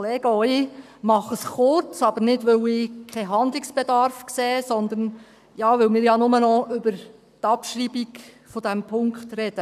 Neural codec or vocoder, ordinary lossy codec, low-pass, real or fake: codec, 44.1 kHz, 7.8 kbps, Pupu-Codec; none; 14.4 kHz; fake